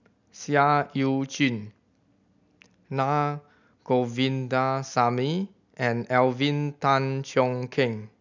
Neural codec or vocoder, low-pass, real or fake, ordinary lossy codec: none; 7.2 kHz; real; none